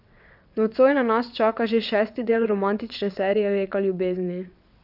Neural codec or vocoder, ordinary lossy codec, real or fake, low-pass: vocoder, 22.05 kHz, 80 mel bands, WaveNeXt; none; fake; 5.4 kHz